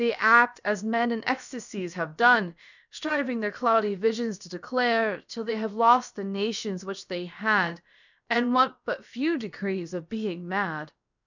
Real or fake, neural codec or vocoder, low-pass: fake; codec, 16 kHz, about 1 kbps, DyCAST, with the encoder's durations; 7.2 kHz